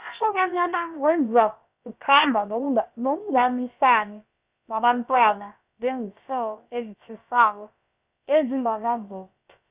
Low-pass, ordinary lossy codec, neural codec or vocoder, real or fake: 3.6 kHz; Opus, 64 kbps; codec, 16 kHz, about 1 kbps, DyCAST, with the encoder's durations; fake